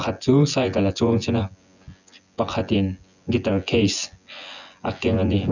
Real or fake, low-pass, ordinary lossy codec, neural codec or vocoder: fake; 7.2 kHz; none; vocoder, 24 kHz, 100 mel bands, Vocos